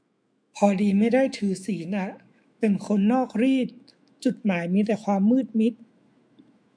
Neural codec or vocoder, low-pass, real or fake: autoencoder, 48 kHz, 128 numbers a frame, DAC-VAE, trained on Japanese speech; 9.9 kHz; fake